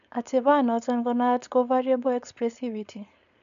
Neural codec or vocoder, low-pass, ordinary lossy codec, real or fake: codec, 16 kHz, 4.8 kbps, FACodec; 7.2 kHz; none; fake